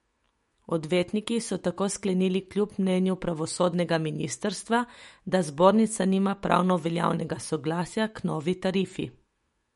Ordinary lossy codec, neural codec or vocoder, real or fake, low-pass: MP3, 48 kbps; autoencoder, 48 kHz, 128 numbers a frame, DAC-VAE, trained on Japanese speech; fake; 19.8 kHz